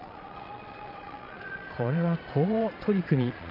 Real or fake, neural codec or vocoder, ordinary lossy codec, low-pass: fake; vocoder, 22.05 kHz, 80 mel bands, Vocos; none; 5.4 kHz